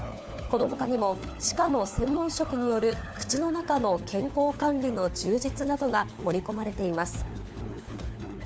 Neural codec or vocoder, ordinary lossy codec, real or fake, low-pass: codec, 16 kHz, 4 kbps, FunCodec, trained on LibriTTS, 50 frames a second; none; fake; none